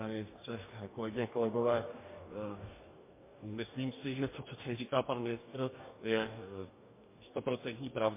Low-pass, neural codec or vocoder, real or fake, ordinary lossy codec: 3.6 kHz; codec, 44.1 kHz, 2.6 kbps, DAC; fake; MP3, 24 kbps